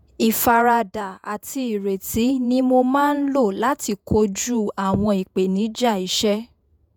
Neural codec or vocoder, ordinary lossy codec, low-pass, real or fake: vocoder, 48 kHz, 128 mel bands, Vocos; none; none; fake